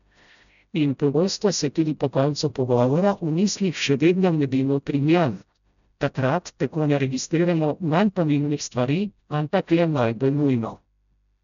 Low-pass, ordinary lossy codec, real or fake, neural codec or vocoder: 7.2 kHz; none; fake; codec, 16 kHz, 0.5 kbps, FreqCodec, smaller model